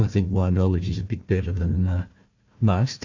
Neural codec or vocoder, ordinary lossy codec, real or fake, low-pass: codec, 16 kHz, 1 kbps, FunCodec, trained on Chinese and English, 50 frames a second; MP3, 48 kbps; fake; 7.2 kHz